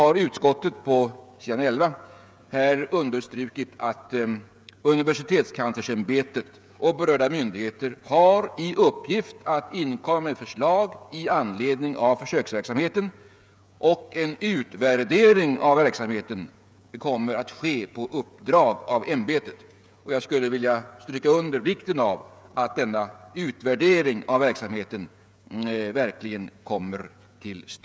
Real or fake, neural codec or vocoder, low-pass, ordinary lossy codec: fake; codec, 16 kHz, 16 kbps, FreqCodec, smaller model; none; none